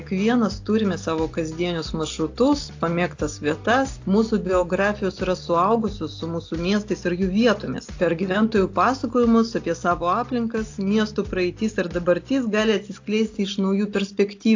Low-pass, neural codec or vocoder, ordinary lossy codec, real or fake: 7.2 kHz; none; AAC, 48 kbps; real